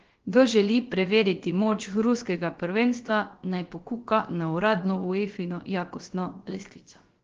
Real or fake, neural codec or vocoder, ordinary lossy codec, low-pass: fake; codec, 16 kHz, about 1 kbps, DyCAST, with the encoder's durations; Opus, 16 kbps; 7.2 kHz